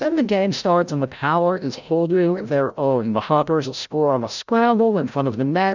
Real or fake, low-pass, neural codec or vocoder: fake; 7.2 kHz; codec, 16 kHz, 0.5 kbps, FreqCodec, larger model